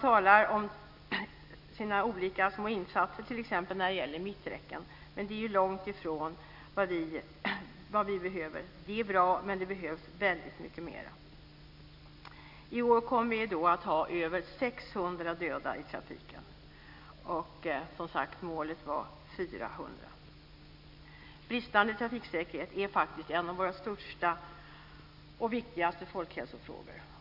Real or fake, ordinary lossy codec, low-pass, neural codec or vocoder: real; AAC, 48 kbps; 5.4 kHz; none